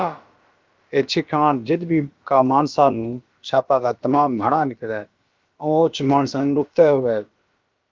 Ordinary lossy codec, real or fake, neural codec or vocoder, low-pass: Opus, 32 kbps; fake; codec, 16 kHz, about 1 kbps, DyCAST, with the encoder's durations; 7.2 kHz